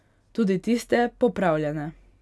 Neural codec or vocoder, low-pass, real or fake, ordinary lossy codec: none; none; real; none